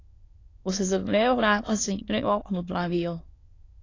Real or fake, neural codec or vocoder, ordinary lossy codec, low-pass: fake; autoencoder, 22.05 kHz, a latent of 192 numbers a frame, VITS, trained on many speakers; AAC, 32 kbps; 7.2 kHz